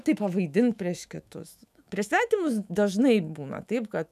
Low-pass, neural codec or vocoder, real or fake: 14.4 kHz; autoencoder, 48 kHz, 128 numbers a frame, DAC-VAE, trained on Japanese speech; fake